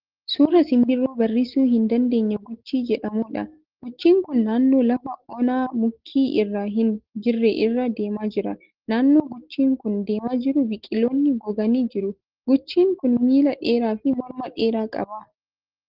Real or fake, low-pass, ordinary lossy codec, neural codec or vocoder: real; 5.4 kHz; Opus, 16 kbps; none